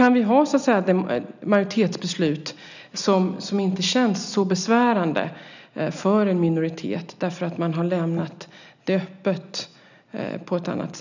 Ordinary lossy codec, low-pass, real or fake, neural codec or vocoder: none; 7.2 kHz; real; none